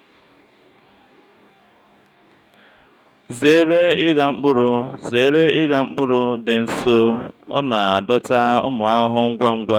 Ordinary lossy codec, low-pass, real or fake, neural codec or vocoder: none; 19.8 kHz; fake; codec, 44.1 kHz, 2.6 kbps, DAC